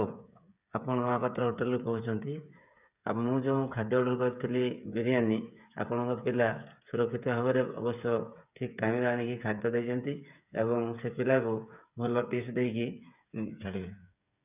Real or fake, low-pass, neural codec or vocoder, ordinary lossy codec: fake; 3.6 kHz; codec, 16 kHz, 8 kbps, FreqCodec, smaller model; none